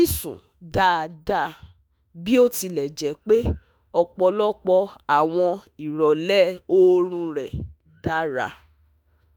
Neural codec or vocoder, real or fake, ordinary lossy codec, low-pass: autoencoder, 48 kHz, 32 numbers a frame, DAC-VAE, trained on Japanese speech; fake; none; none